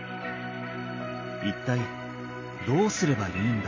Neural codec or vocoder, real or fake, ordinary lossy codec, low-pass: none; real; MP3, 32 kbps; 7.2 kHz